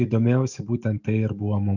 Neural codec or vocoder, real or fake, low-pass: none; real; 7.2 kHz